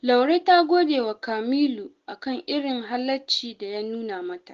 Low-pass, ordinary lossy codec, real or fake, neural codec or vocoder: 7.2 kHz; Opus, 16 kbps; real; none